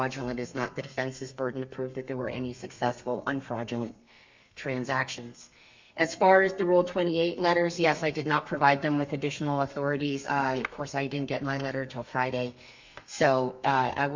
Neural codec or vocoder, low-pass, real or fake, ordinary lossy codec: codec, 32 kHz, 1.9 kbps, SNAC; 7.2 kHz; fake; MP3, 64 kbps